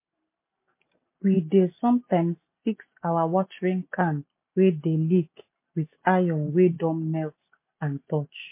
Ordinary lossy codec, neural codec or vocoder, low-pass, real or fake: MP3, 24 kbps; none; 3.6 kHz; real